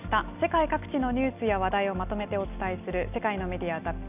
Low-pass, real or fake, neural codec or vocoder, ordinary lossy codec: 3.6 kHz; real; none; none